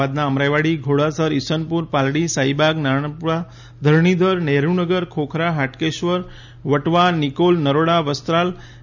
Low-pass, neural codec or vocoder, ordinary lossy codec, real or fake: 7.2 kHz; none; none; real